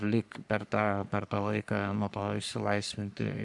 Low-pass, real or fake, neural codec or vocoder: 10.8 kHz; fake; codec, 44.1 kHz, 3.4 kbps, Pupu-Codec